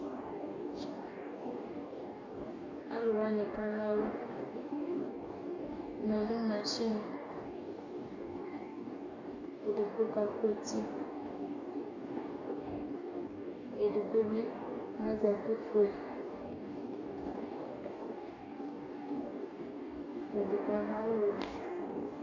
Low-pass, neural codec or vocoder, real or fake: 7.2 kHz; codec, 44.1 kHz, 2.6 kbps, DAC; fake